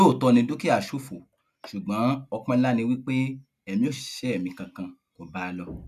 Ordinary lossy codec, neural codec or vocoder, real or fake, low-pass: none; none; real; 14.4 kHz